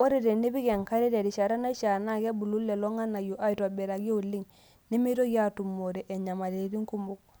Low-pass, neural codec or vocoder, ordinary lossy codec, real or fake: none; none; none; real